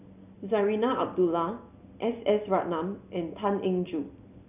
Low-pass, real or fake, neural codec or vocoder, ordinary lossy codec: 3.6 kHz; real; none; none